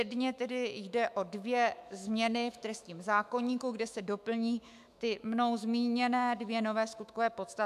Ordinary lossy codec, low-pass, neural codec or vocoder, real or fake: AAC, 96 kbps; 14.4 kHz; autoencoder, 48 kHz, 128 numbers a frame, DAC-VAE, trained on Japanese speech; fake